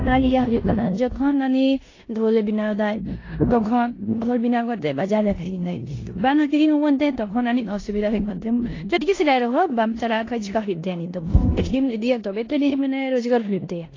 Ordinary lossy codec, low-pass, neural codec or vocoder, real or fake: AAC, 32 kbps; 7.2 kHz; codec, 16 kHz in and 24 kHz out, 0.9 kbps, LongCat-Audio-Codec, four codebook decoder; fake